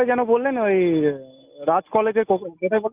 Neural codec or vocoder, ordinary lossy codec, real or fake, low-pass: none; Opus, 32 kbps; real; 3.6 kHz